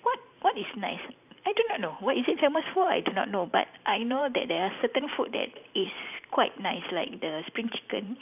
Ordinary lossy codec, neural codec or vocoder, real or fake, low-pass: none; vocoder, 44.1 kHz, 128 mel bands every 512 samples, BigVGAN v2; fake; 3.6 kHz